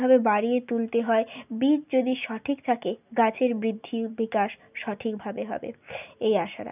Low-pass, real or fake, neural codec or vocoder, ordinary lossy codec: 3.6 kHz; real; none; none